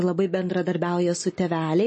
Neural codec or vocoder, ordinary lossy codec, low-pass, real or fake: none; MP3, 32 kbps; 10.8 kHz; real